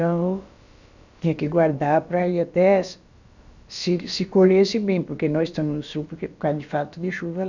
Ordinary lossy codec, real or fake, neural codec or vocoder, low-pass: none; fake; codec, 16 kHz, about 1 kbps, DyCAST, with the encoder's durations; 7.2 kHz